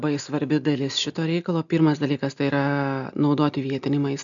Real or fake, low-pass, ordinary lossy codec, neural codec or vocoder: real; 7.2 kHz; MP3, 96 kbps; none